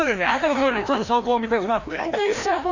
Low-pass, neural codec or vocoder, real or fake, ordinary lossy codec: 7.2 kHz; codec, 16 kHz, 1 kbps, FreqCodec, larger model; fake; Opus, 64 kbps